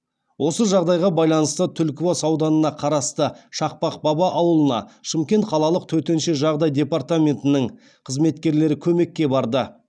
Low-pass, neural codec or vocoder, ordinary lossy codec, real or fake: 9.9 kHz; none; none; real